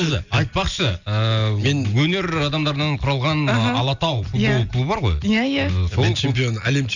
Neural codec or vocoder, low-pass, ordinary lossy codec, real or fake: none; 7.2 kHz; none; real